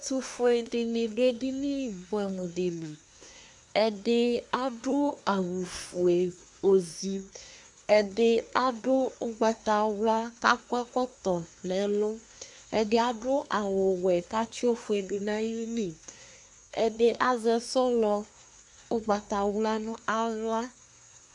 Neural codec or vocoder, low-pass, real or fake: codec, 24 kHz, 1 kbps, SNAC; 10.8 kHz; fake